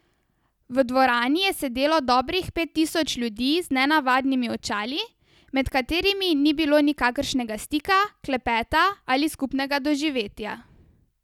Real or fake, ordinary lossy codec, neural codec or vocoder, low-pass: real; none; none; 19.8 kHz